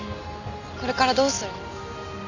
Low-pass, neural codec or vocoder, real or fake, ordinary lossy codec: 7.2 kHz; none; real; none